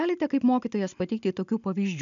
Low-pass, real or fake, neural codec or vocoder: 7.2 kHz; real; none